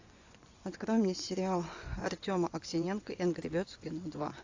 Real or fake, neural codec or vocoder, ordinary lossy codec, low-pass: fake; vocoder, 24 kHz, 100 mel bands, Vocos; AAC, 48 kbps; 7.2 kHz